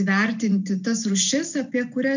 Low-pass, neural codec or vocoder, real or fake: 7.2 kHz; none; real